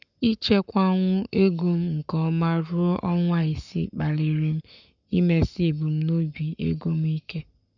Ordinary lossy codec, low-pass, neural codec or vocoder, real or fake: none; 7.2 kHz; codec, 44.1 kHz, 7.8 kbps, Pupu-Codec; fake